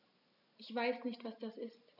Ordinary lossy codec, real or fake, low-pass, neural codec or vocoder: none; real; 5.4 kHz; none